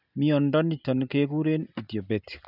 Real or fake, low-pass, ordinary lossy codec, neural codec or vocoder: real; 5.4 kHz; none; none